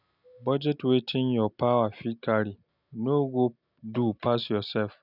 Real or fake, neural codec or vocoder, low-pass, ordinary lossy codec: real; none; 5.4 kHz; none